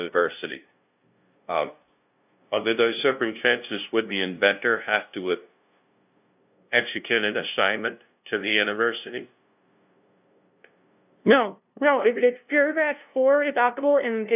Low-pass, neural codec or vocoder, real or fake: 3.6 kHz; codec, 16 kHz, 0.5 kbps, FunCodec, trained on LibriTTS, 25 frames a second; fake